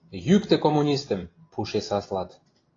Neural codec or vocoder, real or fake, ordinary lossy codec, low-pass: none; real; AAC, 32 kbps; 7.2 kHz